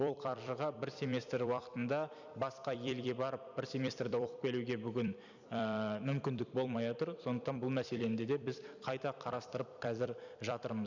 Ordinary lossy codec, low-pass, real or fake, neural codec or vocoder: none; 7.2 kHz; fake; vocoder, 44.1 kHz, 128 mel bands every 256 samples, BigVGAN v2